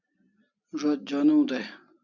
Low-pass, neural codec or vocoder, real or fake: 7.2 kHz; none; real